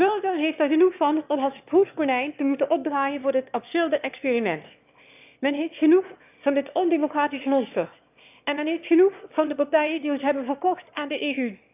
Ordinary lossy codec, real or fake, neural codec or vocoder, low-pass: none; fake; autoencoder, 22.05 kHz, a latent of 192 numbers a frame, VITS, trained on one speaker; 3.6 kHz